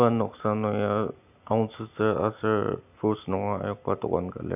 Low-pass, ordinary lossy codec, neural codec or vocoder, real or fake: 3.6 kHz; none; none; real